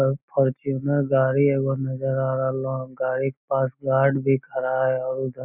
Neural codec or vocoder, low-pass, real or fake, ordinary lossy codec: none; 3.6 kHz; real; none